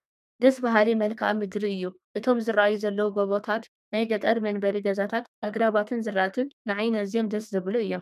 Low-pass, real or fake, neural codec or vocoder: 14.4 kHz; fake; codec, 32 kHz, 1.9 kbps, SNAC